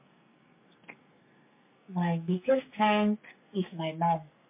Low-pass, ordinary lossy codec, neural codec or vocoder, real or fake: 3.6 kHz; MP3, 24 kbps; codec, 44.1 kHz, 2.6 kbps, SNAC; fake